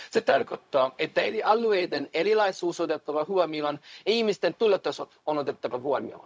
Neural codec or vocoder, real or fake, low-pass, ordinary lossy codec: codec, 16 kHz, 0.4 kbps, LongCat-Audio-Codec; fake; none; none